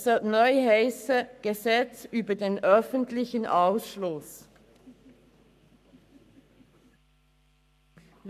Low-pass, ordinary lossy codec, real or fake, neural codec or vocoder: 14.4 kHz; none; fake; codec, 44.1 kHz, 7.8 kbps, Pupu-Codec